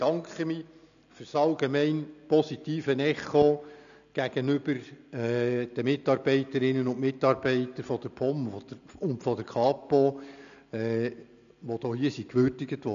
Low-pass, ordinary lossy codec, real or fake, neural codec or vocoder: 7.2 kHz; none; real; none